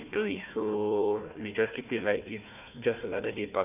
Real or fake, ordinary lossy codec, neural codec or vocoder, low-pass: fake; none; codec, 16 kHz, 1 kbps, FunCodec, trained on Chinese and English, 50 frames a second; 3.6 kHz